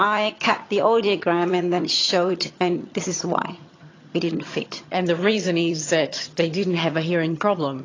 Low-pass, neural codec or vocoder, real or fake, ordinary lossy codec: 7.2 kHz; vocoder, 22.05 kHz, 80 mel bands, HiFi-GAN; fake; AAC, 32 kbps